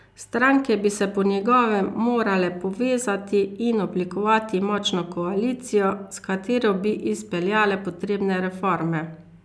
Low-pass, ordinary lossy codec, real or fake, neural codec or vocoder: none; none; real; none